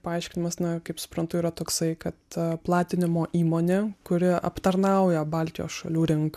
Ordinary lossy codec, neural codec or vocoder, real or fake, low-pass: MP3, 96 kbps; none; real; 14.4 kHz